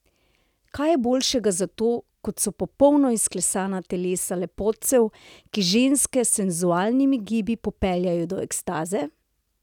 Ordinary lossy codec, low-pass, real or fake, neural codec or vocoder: none; 19.8 kHz; real; none